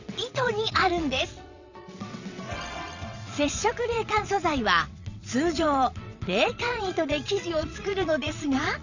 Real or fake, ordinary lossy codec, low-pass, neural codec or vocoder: fake; none; 7.2 kHz; vocoder, 22.05 kHz, 80 mel bands, WaveNeXt